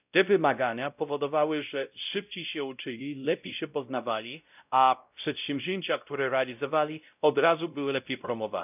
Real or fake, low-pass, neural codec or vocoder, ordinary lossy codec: fake; 3.6 kHz; codec, 16 kHz, 0.5 kbps, X-Codec, WavLM features, trained on Multilingual LibriSpeech; none